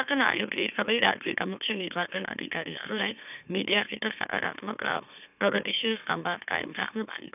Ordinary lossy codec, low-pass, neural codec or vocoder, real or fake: none; 3.6 kHz; autoencoder, 44.1 kHz, a latent of 192 numbers a frame, MeloTTS; fake